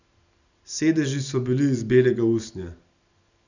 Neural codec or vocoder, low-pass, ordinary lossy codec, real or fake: none; 7.2 kHz; none; real